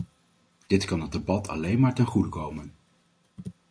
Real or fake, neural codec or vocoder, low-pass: real; none; 9.9 kHz